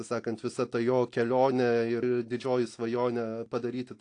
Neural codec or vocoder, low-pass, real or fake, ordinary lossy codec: none; 9.9 kHz; real; AAC, 48 kbps